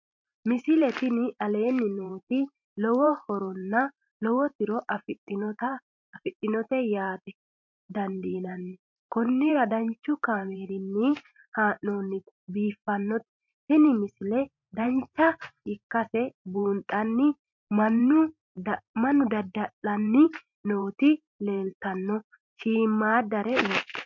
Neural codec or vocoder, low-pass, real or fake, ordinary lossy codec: vocoder, 44.1 kHz, 128 mel bands every 512 samples, BigVGAN v2; 7.2 kHz; fake; MP3, 48 kbps